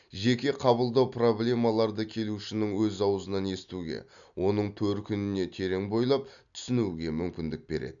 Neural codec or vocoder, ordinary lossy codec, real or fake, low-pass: none; none; real; 7.2 kHz